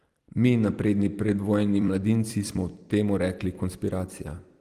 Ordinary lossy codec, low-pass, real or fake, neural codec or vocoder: Opus, 24 kbps; 14.4 kHz; fake; vocoder, 44.1 kHz, 128 mel bands every 256 samples, BigVGAN v2